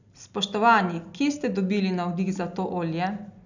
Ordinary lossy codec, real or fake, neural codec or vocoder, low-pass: none; real; none; 7.2 kHz